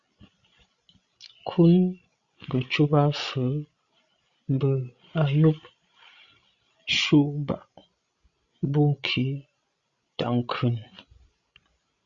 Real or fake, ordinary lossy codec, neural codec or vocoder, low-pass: fake; Opus, 64 kbps; codec, 16 kHz, 16 kbps, FreqCodec, larger model; 7.2 kHz